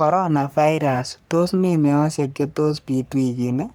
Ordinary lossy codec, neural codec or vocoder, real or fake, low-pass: none; codec, 44.1 kHz, 3.4 kbps, Pupu-Codec; fake; none